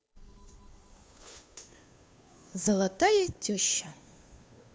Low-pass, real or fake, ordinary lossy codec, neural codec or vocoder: none; fake; none; codec, 16 kHz, 2 kbps, FunCodec, trained on Chinese and English, 25 frames a second